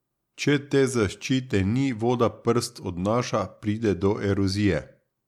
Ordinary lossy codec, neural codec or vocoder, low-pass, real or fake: MP3, 96 kbps; none; 19.8 kHz; real